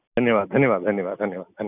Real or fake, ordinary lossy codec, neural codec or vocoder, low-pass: real; none; none; 3.6 kHz